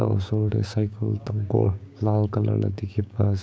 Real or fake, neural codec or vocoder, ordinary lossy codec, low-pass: fake; codec, 16 kHz, 6 kbps, DAC; none; none